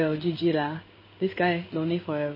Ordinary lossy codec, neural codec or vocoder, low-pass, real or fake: MP3, 24 kbps; codec, 16 kHz in and 24 kHz out, 1 kbps, XY-Tokenizer; 5.4 kHz; fake